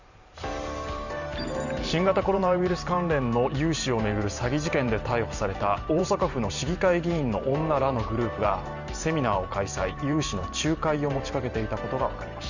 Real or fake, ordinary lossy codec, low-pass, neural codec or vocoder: fake; none; 7.2 kHz; vocoder, 44.1 kHz, 128 mel bands every 512 samples, BigVGAN v2